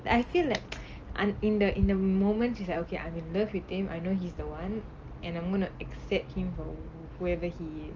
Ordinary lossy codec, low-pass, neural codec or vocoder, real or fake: Opus, 24 kbps; 7.2 kHz; none; real